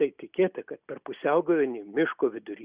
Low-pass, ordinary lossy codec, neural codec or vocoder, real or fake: 3.6 kHz; Opus, 64 kbps; none; real